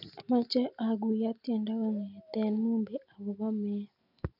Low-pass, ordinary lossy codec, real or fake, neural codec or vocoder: 5.4 kHz; none; real; none